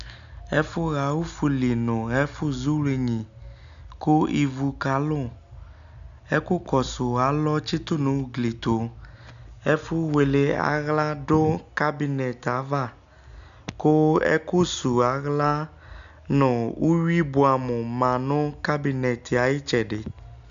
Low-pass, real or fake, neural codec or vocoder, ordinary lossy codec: 7.2 kHz; real; none; AAC, 96 kbps